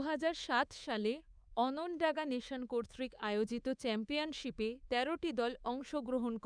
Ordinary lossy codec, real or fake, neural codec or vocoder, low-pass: none; fake; codec, 24 kHz, 3.1 kbps, DualCodec; 9.9 kHz